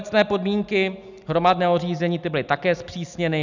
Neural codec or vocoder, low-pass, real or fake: none; 7.2 kHz; real